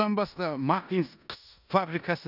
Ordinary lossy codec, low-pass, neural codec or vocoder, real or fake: none; 5.4 kHz; codec, 16 kHz in and 24 kHz out, 0.9 kbps, LongCat-Audio-Codec, four codebook decoder; fake